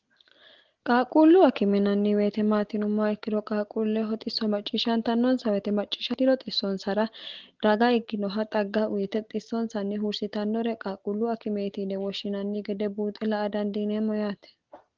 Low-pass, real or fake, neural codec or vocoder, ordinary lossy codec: 7.2 kHz; real; none; Opus, 16 kbps